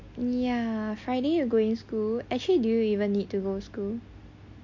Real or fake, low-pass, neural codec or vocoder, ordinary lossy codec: real; 7.2 kHz; none; MP3, 48 kbps